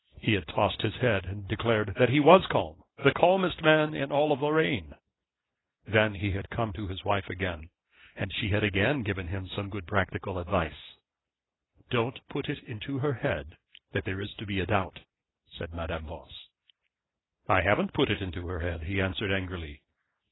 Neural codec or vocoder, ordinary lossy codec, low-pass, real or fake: none; AAC, 16 kbps; 7.2 kHz; real